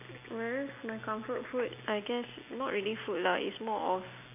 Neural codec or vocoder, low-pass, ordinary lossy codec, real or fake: none; 3.6 kHz; none; real